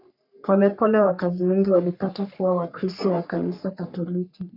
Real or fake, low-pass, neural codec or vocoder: fake; 5.4 kHz; codec, 44.1 kHz, 3.4 kbps, Pupu-Codec